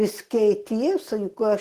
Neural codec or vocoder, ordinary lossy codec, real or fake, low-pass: none; Opus, 16 kbps; real; 14.4 kHz